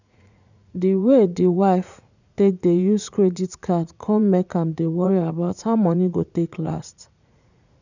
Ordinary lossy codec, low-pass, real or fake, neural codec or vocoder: none; 7.2 kHz; fake; vocoder, 44.1 kHz, 80 mel bands, Vocos